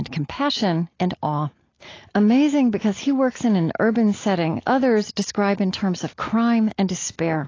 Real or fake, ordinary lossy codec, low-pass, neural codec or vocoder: real; AAC, 32 kbps; 7.2 kHz; none